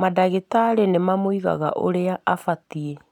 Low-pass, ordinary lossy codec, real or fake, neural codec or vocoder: 19.8 kHz; none; real; none